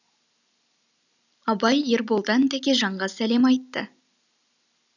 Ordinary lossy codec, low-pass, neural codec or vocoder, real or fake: none; 7.2 kHz; none; real